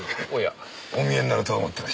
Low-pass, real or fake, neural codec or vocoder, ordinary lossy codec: none; real; none; none